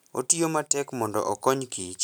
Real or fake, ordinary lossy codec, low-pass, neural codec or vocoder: real; none; none; none